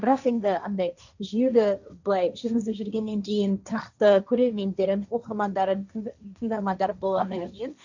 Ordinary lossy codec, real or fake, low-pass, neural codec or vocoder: none; fake; 7.2 kHz; codec, 16 kHz, 1.1 kbps, Voila-Tokenizer